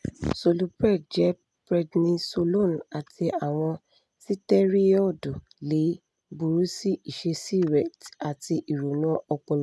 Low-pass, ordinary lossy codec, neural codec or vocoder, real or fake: none; none; none; real